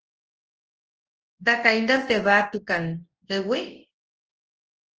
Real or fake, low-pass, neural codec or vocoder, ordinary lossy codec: fake; 7.2 kHz; codec, 24 kHz, 0.9 kbps, WavTokenizer, large speech release; Opus, 16 kbps